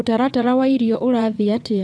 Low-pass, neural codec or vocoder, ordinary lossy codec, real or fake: none; vocoder, 22.05 kHz, 80 mel bands, WaveNeXt; none; fake